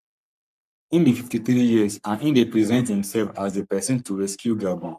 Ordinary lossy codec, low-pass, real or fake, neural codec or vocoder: none; 14.4 kHz; fake; codec, 44.1 kHz, 3.4 kbps, Pupu-Codec